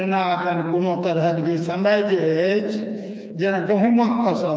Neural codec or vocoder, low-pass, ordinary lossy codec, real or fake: codec, 16 kHz, 2 kbps, FreqCodec, smaller model; none; none; fake